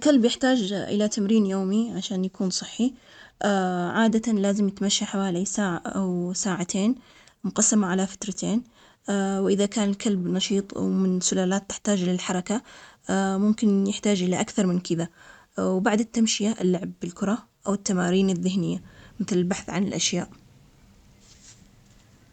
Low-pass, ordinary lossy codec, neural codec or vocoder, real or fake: 19.8 kHz; none; none; real